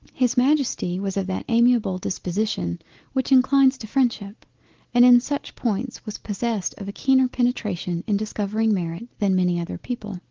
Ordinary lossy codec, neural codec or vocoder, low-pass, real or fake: Opus, 16 kbps; none; 7.2 kHz; real